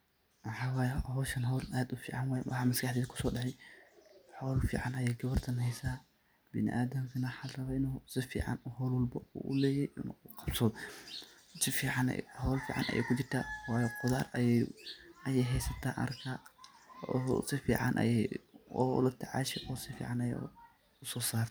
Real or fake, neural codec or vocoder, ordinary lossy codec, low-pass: real; none; none; none